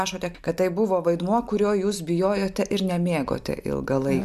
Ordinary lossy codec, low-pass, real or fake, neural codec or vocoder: MP3, 96 kbps; 14.4 kHz; real; none